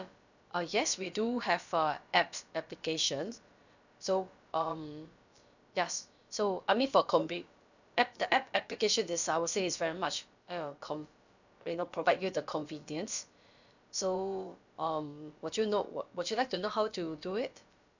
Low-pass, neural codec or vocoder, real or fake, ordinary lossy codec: 7.2 kHz; codec, 16 kHz, about 1 kbps, DyCAST, with the encoder's durations; fake; none